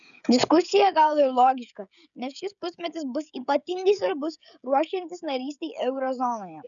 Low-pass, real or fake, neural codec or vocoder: 7.2 kHz; fake; codec, 16 kHz, 16 kbps, FreqCodec, smaller model